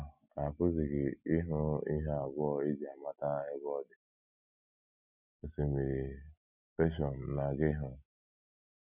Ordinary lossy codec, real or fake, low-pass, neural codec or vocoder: none; real; 3.6 kHz; none